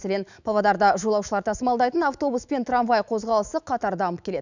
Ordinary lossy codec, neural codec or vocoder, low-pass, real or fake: none; autoencoder, 48 kHz, 128 numbers a frame, DAC-VAE, trained on Japanese speech; 7.2 kHz; fake